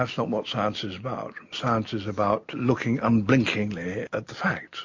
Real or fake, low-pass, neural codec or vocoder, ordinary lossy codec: real; 7.2 kHz; none; MP3, 48 kbps